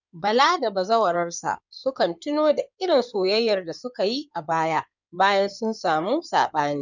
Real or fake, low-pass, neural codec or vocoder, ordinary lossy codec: fake; 7.2 kHz; codec, 16 kHz in and 24 kHz out, 2.2 kbps, FireRedTTS-2 codec; none